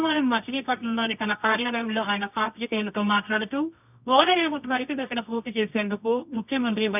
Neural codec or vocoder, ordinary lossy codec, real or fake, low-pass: codec, 24 kHz, 0.9 kbps, WavTokenizer, medium music audio release; none; fake; 3.6 kHz